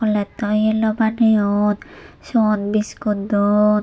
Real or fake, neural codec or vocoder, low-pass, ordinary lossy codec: real; none; none; none